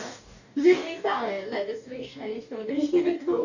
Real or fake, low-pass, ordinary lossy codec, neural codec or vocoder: fake; 7.2 kHz; none; codec, 44.1 kHz, 2.6 kbps, DAC